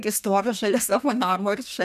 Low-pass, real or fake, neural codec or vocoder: 14.4 kHz; fake; codec, 32 kHz, 1.9 kbps, SNAC